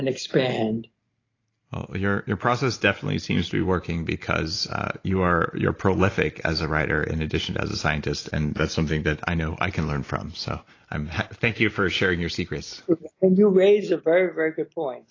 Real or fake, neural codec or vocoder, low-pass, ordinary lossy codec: real; none; 7.2 kHz; AAC, 32 kbps